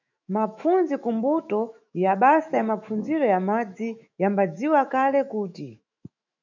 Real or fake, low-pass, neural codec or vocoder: fake; 7.2 kHz; autoencoder, 48 kHz, 128 numbers a frame, DAC-VAE, trained on Japanese speech